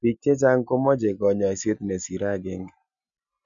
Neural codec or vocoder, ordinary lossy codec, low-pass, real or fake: none; none; 7.2 kHz; real